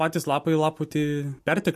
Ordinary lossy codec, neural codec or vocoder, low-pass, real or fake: MP3, 64 kbps; none; 14.4 kHz; real